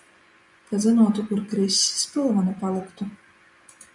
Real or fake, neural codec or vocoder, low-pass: fake; vocoder, 24 kHz, 100 mel bands, Vocos; 10.8 kHz